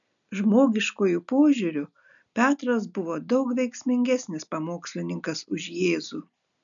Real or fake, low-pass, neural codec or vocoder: real; 7.2 kHz; none